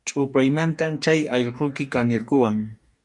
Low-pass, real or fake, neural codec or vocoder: 10.8 kHz; fake; codec, 44.1 kHz, 2.6 kbps, DAC